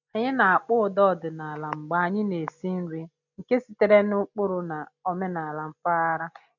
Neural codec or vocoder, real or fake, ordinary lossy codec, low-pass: none; real; none; 7.2 kHz